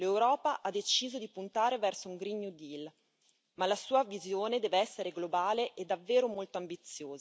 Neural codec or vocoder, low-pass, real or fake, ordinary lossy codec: none; none; real; none